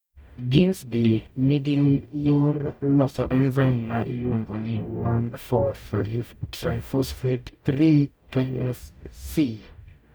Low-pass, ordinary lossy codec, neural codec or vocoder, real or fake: none; none; codec, 44.1 kHz, 0.9 kbps, DAC; fake